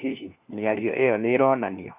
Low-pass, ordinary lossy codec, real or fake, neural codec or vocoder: 3.6 kHz; AAC, 32 kbps; fake; codec, 16 kHz, 1 kbps, FunCodec, trained on LibriTTS, 50 frames a second